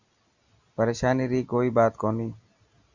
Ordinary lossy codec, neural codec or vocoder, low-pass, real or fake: Opus, 64 kbps; none; 7.2 kHz; real